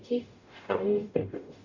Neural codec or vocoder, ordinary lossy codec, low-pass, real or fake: codec, 44.1 kHz, 0.9 kbps, DAC; none; 7.2 kHz; fake